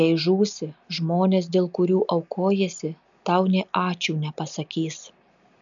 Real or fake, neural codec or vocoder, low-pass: real; none; 7.2 kHz